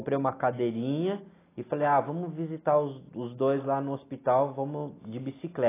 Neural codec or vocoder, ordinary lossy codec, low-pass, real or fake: none; AAC, 16 kbps; 3.6 kHz; real